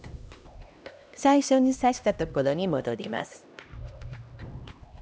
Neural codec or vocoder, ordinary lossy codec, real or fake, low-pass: codec, 16 kHz, 1 kbps, X-Codec, HuBERT features, trained on LibriSpeech; none; fake; none